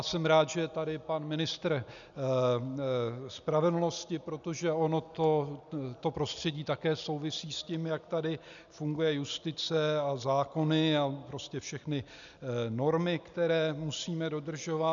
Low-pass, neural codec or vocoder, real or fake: 7.2 kHz; none; real